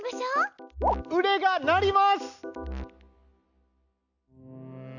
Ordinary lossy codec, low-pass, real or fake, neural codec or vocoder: none; 7.2 kHz; real; none